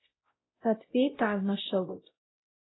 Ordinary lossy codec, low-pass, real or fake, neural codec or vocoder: AAC, 16 kbps; 7.2 kHz; fake; codec, 16 kHz, 0.5 kbps, X-Codec, WavLM features, trained on Multilingual LibriSpeech